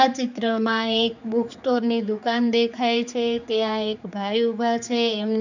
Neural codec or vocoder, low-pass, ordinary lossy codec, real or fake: codec, 16 kHz, 4 kbps, X-Codec, HuBERT features, trained on general audio; 7.2 kHz; none; fake